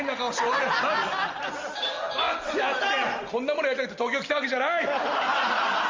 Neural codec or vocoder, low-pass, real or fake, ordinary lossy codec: none; 7.2 kHz; real; Opus, 32 kbps